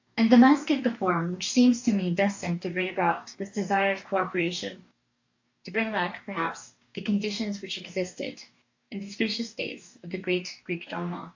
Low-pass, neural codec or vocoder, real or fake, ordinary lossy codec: 7.2 kHz; codec, 44.1 kHz, 2.6 kbps, DAC; fake; AAC, 48 kbps